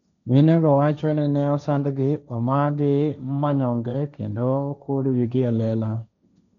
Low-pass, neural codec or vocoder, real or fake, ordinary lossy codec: 7.2 kHz; codec, 16 kHz, 1.1 kbps, Voila-Tokenizer; fake; none